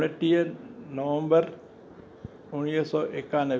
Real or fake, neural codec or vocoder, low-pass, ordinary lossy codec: real; none; none; none